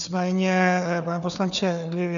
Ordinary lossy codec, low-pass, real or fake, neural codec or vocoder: MP3, 96 kbps; 7.2 kHz; fake; codec, 16 kHz, 4 kbps, FunCodec, trained on Chinese and English, 50 frames a second